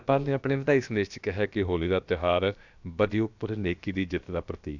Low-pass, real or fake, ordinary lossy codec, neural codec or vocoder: 7.2 kHz; fake; none; codec, 16 kHz, about 1 kbps, DyCAST, with the encoder's durations